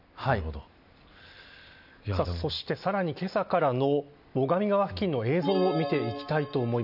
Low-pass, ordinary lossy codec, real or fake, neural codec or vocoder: 5.4 kHz; none; real; none